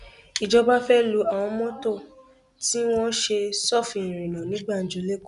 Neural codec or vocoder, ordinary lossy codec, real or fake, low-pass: none; none; real; 10.8 kHz